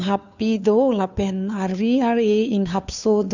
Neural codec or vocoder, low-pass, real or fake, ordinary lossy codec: codec, 16 kHz, 2 kbps, FunCodec, trained on Chinese and English, 25 frames a second; 7.2 kHz; fake; none